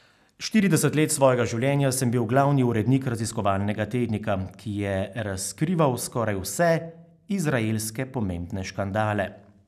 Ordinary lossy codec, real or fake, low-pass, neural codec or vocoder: none; real; 14.4 kHz; none